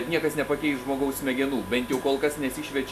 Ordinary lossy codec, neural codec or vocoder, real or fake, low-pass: AAC, 96 kbps; none; real; 14.4 kHz